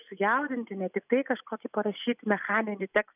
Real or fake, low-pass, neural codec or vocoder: real; 3.6 kHz; none